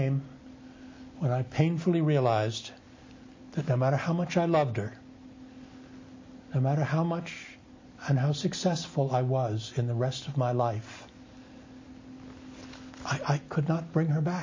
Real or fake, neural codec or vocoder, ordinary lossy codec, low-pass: real; none; MP3, 32 kbps; 7.2 kHz